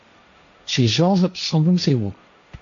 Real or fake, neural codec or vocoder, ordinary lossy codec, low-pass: fake; codec, 16 kHz, 1.1 kbps, Voila-Tokenizer; AAC, 64 kbps; 7.2 kHz